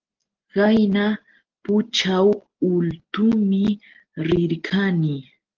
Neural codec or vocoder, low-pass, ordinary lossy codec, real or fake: none; 7.2 kHz; Opus, 16 kbps; real